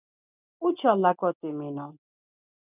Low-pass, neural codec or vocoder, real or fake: 3.6 kHz; none; real